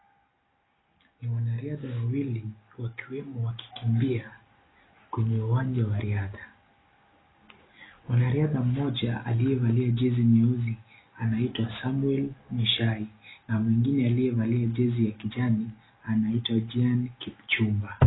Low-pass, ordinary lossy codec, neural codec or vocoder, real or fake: 7.2 kHz; AAC, 16 kbps; none; real